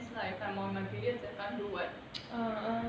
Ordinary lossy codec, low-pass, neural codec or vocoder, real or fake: none; none; none; real